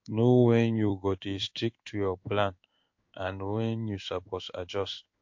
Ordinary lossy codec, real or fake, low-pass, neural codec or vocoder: MP3, 48 kbps; fake; 7.2 kHz; codec, 16 kHz in and 24 kHz out, 1 kbps, XY-Tokenizer